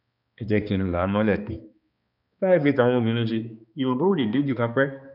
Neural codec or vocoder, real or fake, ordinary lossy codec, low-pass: codec, 16 kHz, 2 kbps, X-Codec, HuBERT features, trained on balanced general audio; fake; none; 5.4 kHz